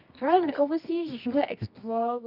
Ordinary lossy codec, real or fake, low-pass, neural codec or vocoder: none; fake; 5.4 kHz; codec, 24 kHz, 0.9 kbps, WavTokenizer, medium music audio release